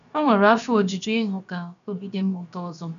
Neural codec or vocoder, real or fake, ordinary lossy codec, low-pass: codec, 16 kHz, about 1 kbps, DyCAST, with the encoder's durations; fake; none; 7.2 kHz